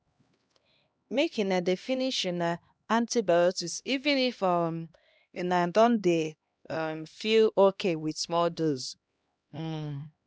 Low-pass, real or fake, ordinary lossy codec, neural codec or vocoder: none; fake; none; codec, 16 kHz, 1 kbps, X-Codec, HuBERT features, trained on LibriSpeech